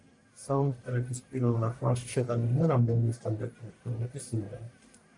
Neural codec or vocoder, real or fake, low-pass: codec, 44.1 kHz, 1.7 kbps, Pupu-Codec; fake; 10.8 kHz